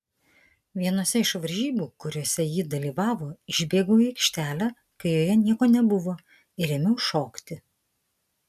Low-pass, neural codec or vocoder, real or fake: 14.4 kHz; none; real